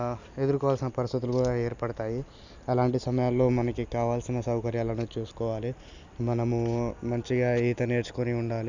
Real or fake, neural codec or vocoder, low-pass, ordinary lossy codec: real; none; 7.2 kHz; none